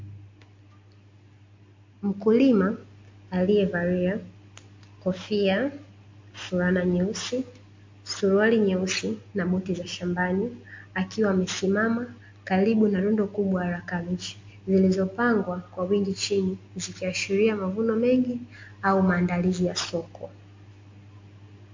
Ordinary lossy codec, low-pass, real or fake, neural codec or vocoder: MP3, 48 kbps; 7.2 kHz; real; none